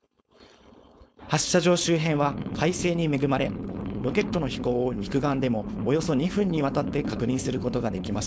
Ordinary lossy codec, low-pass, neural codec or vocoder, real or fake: none; none; codec, 16 kHz, 4.8 kbps, FACodec; fake